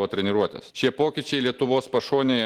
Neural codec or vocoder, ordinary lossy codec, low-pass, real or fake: none; Opus, 16 kbps; 19.8 kHz; real